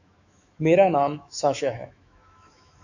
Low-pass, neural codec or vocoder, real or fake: 7.2 kHz; autoencoder, 48 kHz, 128 numbers a frame, DAC-VAE, trained on Japanese speech; fake